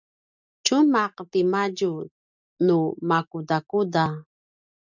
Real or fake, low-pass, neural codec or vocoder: real; 7.2 kHz; none